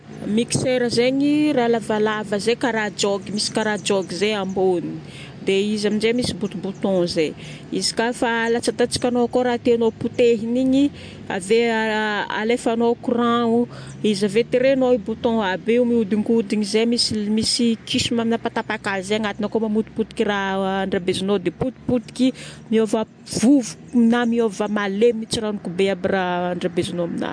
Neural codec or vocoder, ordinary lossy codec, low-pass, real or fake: none; MP3, 64 kbps; 9.9 kHz; real